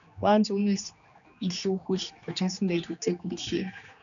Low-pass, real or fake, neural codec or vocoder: 7.2 kHz; fake; codec, 16 kHz, 2 kbps, X-Codec, HuBERT features, trained on general audio